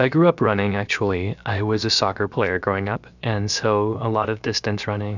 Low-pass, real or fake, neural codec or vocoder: 7.2 kHz; fake; codec, 16 kHz, about 1 kbps, DyCAST, with the encoder's durations